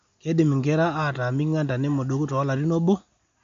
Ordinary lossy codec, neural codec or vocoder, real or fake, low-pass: MP3, 48 kbps; none; real; 7.2 kHz